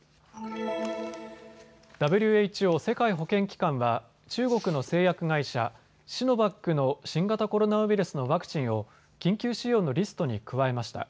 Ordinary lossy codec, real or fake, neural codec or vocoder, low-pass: none; real; none; none